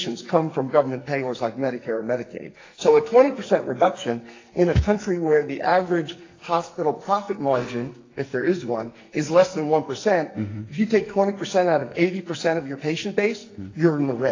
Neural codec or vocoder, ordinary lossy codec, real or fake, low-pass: codec, 44.1 kHz, 2.6 kbps, SNAC; AAC, 32 kbps; fake; 7.2 kHz